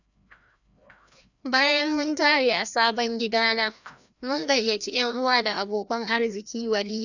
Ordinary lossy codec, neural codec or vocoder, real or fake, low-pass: none; codec, 16 kHz, 1 kbps, FreqCodec, larger model; fake; 7.2 kHz